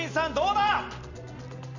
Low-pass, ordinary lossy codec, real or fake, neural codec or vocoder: 7.2 kHz; none; real; none